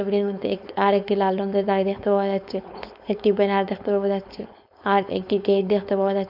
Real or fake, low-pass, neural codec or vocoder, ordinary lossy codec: fake; 5.4 kHz; codec, 16 kHz, 4.8 kbps, FACodec; none